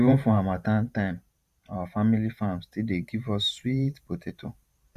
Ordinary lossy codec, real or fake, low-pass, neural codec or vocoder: Opus, 64 kbps; fake; 14.4 kHz; vocoder, 44.1 kHz, 128 mel bands every 512 samples, BigVGAN v2